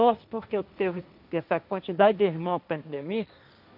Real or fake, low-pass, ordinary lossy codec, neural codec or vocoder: fake; 5.4 kHz; none; codec, 16 kHz, 1.1 kbps, Voila-Tokenizer